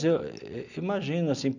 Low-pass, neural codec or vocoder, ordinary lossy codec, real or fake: 7.2 kHz; none; none; real